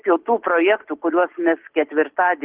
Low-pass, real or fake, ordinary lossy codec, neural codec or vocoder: 3.6 kHz; real; Opus, 16 kbps; none